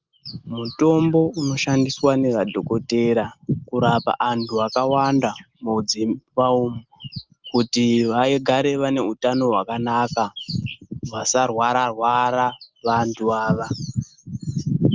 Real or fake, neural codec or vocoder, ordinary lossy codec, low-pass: real; none; Opus, 24 kbps; 7.2 kHz